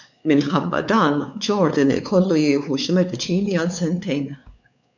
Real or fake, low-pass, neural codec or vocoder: fake; 7.2 kHz; codec, 16 kHz, 4 kbps, X-Codec, WavLM features, trained on Multilingual LibriSpeech